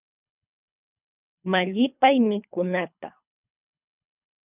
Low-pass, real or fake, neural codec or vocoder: 3.6 kHz; fake; codec, 24 kHz, 3 kbps, HILCodec